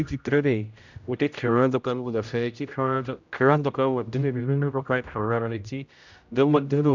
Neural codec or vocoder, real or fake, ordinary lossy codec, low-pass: codec, 16 kHz, 0.5 kbps, X-Codec, HuBERT features, trained on general audio; fake; none; 7.2 kHz